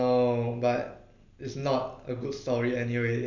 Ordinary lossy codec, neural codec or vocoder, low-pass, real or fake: none; none; 7.2 kHz; real